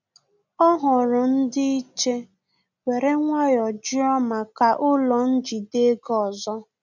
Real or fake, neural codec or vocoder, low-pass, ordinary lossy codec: real; none; 7.2 kHz; none